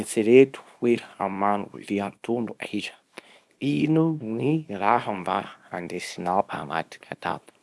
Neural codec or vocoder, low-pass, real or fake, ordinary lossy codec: codec, 24 kHz, 0.9 kbps, WavTokenizer, small release; none; fake; none